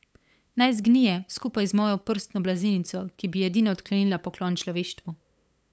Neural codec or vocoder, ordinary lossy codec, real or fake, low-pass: codec, 16 kHz, 8 kbps, FunCodec, trained on LibriTTS, 25 frames a second; none; fake; none